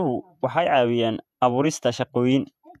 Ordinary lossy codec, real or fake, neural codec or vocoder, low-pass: none; fake; vocoder, 48 kHz, 128 mel bands, Vocos; 14.4 kHz